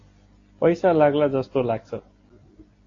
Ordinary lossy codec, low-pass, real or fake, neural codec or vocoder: AAC, 32 kbps; 7.2 kHz; real; none